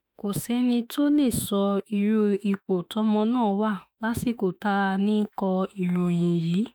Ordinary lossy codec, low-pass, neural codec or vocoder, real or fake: none; none; autoencoder, 48 kHz, 32 numbers a frame, DAC-VAE, trained on Japanese speech; fake